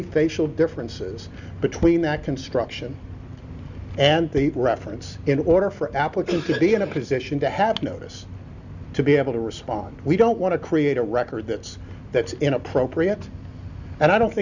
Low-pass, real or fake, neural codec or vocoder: 7.2 kHz; real; none